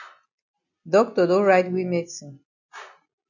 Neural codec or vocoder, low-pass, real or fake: none; 7.2 kHz; real